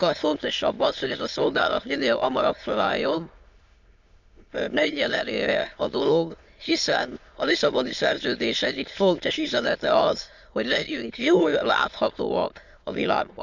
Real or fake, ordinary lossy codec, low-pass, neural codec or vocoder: fake; Opus, 64 kbps; 7.2 kHz; autoencoder, 22.05 kHz, a latent of 192 numbers a frame, VITS, trained on many speakers